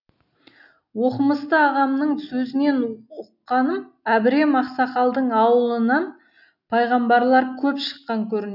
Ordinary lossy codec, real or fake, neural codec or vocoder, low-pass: none; real; none; 5.4 kHz